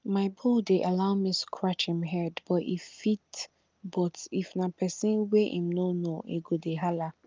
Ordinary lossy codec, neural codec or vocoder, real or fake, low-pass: Opus, 32 kbps; none; real; 7.2 kHz